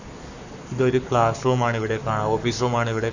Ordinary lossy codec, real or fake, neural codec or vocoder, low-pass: none; fake; codec, 44.1 kHz, 7.8 kbps, Pupu-Codec; 7.2 kHz